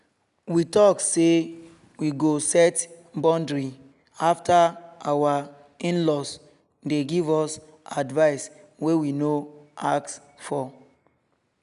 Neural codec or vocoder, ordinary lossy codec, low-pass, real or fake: none; none; 10.8 kHz; real